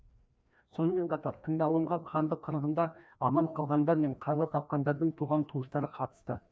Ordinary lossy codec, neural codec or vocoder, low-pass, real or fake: none; codec, 16 kHz, 1 kbps, FreqCodec, larger model; none; fake